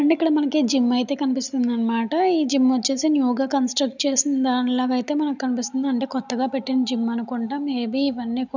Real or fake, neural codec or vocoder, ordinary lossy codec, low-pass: real; none; none; 7.2 kHz